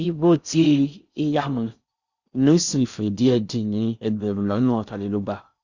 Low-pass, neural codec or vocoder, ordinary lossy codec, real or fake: 7.2 kHz; codec, 16 kHz in and 24 kHz out, 0.6 kbps, FocalCodec, streaming, 4096 codes; Opus, 64 kbps; fake